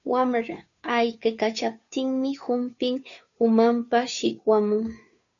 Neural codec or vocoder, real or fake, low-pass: codec, 16 kHz, 2 kbps, FunCodec, trained on Chinese and English, 25 frames a second; fake; 7.2 kHz